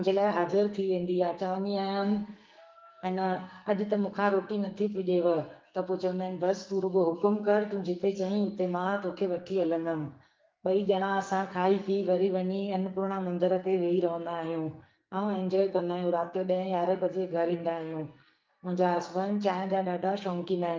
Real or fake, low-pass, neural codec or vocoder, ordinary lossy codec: fake; 7.2 kHz; codec, 44.1 kHz, 2.6 kbps, SNAC; Opus, 24 kbps